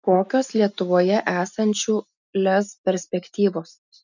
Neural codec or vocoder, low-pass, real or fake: none; 7.2 kHz; real